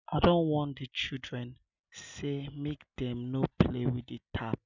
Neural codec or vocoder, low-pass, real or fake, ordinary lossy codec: none; 7.2 kHz; real; none